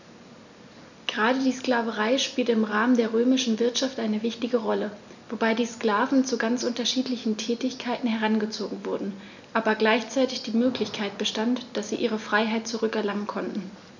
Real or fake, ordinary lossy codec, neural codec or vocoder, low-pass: real; none; none; 7.2 kHz